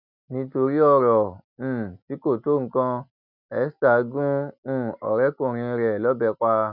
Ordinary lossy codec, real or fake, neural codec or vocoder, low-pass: none; real; none; 5.4 kHz